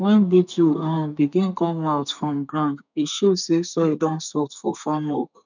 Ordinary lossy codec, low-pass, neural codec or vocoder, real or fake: none; 7.2 kHz; codec, 32 kHz, 1.9 kbps, SNAC; fake